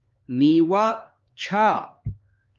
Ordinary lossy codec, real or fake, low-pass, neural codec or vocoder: Opus, 32 kbps; fake; 7.2 kHz; codec, 16 kHz, 2 kbps, X-Codec, HuBERT features, trained on LibriSpeech